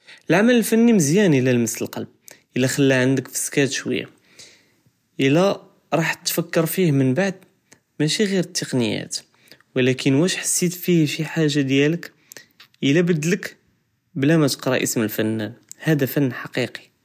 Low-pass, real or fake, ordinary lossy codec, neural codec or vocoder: 14.4 kHz; real; none; none